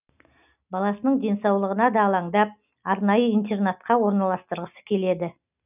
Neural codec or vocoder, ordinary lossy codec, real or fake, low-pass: none; none; real; 3.6 kHz